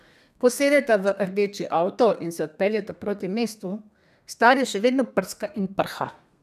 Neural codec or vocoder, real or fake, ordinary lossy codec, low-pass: codec, 32 kHz, 1.9 kbps, SNAC; fake; none; 14.4 kHz